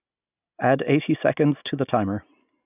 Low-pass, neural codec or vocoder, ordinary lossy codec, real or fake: 3.6 kHz; none; none; real